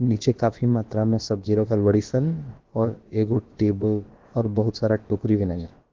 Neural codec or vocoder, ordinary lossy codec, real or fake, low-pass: codec, 16 kHz, about 1 kbps, DyCAST, with the encoder's durations; Opus, 16 kbps; fake; 7.2 kHz